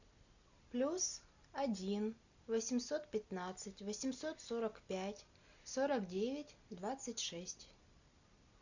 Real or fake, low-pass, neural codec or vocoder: real; 7.2 kHz; none